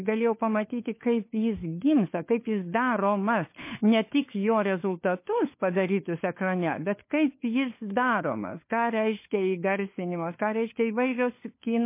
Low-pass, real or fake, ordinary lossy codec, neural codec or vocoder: 3.6 kHz; fake; MP3, 24 kbps; codec, 16 kHz, 4 kbps, FunCodec, trained on LibriTTS, 50 frames a second